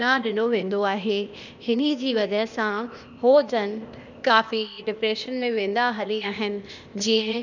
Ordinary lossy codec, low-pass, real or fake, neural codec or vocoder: none; 7.2 kHz; fake; codec, 16 kHz, 0.8 kbps, ZipCodec